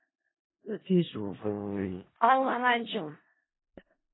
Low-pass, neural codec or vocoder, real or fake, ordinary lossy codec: 7.2 kHz; codec, 16 kHz in and 24 kHz out, 0.4 kbps, LongCat-Audio-Codec, four codebook decoder; fake; AAC, 16 kbps